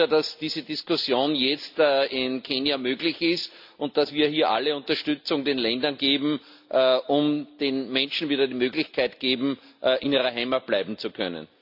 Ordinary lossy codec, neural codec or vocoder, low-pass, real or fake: AAC, 48 kbps; none; 5.4 kHz; real